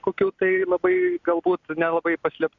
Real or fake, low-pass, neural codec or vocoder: real; 7.2 kHz; none